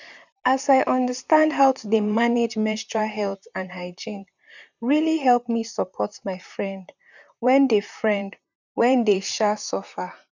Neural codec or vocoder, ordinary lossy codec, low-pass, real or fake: vocoder, 44.1 kHz, 128 mel bands, Pupu-Vocoder; none; 7.2 kHz; fake